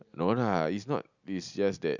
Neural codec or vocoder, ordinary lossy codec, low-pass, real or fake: none; none; 7.2 kHz; real